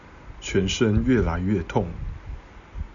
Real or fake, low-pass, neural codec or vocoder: real; 7.2 kHz; none